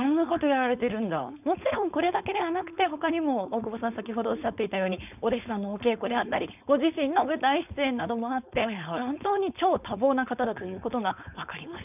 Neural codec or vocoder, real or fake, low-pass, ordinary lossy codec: codec, 16 kHz, 4.8 kbps, FACodec; fake; 3.6 kHz; none